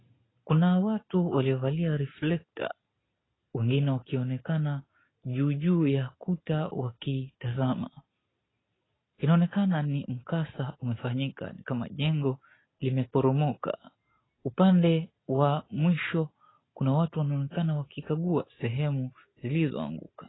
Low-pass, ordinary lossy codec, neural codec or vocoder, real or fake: 7.2 kHz; AAC, 16 kbps; none; real